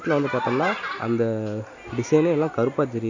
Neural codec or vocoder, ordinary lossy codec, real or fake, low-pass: none; AAC, 48 kbps; real; 7.2 kHz